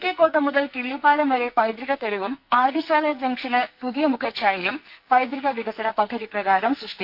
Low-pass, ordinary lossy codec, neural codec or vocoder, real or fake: 5.4 kHz; none; codec, 44.1 kHz, 2.6 kbps, SNAC; fake